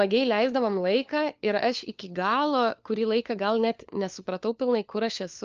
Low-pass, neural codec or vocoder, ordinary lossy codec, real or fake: 7.2 kHz; codec, 16 kHz, 4 kbps, FunCodec, trained on LibriTTS, 50 frames a second; Opus, 24 kbps; fake